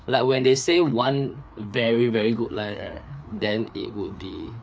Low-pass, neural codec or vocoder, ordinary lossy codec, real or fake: none; codec, 16 kHz, 4 kbps, FreqCodec, larger model; none; fake